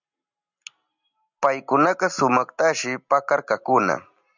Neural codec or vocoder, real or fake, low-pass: none; real; 7.2 kHz